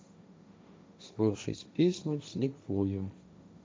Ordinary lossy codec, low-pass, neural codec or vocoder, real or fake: none; none; codec, 16 kHz, 1.1 kbps, Voila-Tokenizer; fake